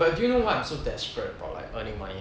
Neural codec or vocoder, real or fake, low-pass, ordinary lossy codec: none; real; none; none